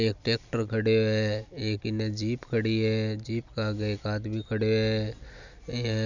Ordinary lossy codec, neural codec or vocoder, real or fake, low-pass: none; none; real; 7.2 kHz